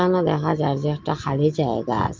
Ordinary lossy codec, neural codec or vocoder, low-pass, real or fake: Opus, 32 kbps; none; 7.2 kHz; real